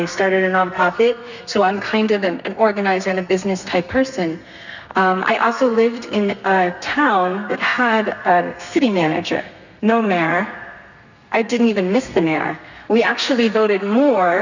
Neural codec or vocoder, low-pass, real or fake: codec, 32 kHz, 1.9 kbps, SNAC; 7.2 kHz; fake